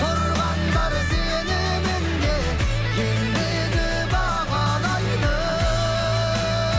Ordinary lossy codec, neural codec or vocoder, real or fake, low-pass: none; none; real; none